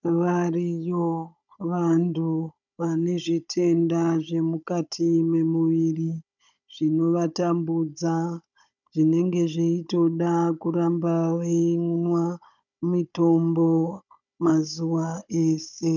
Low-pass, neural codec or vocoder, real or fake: 7.2 kHz; codec, 16 kHz, 16 kbps, FunCodec, trained on Chinese and English, 50 frames a second; fake